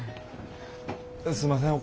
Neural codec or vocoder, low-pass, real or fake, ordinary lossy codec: none; none; real; none